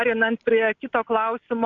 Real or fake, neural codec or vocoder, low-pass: real; none; 7.2 kHz